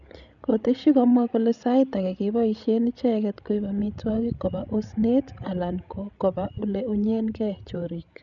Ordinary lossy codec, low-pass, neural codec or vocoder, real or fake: none; 7.2 kHz; codec, 16 kHz, 16 kbps, FreqCodec, larger model; fake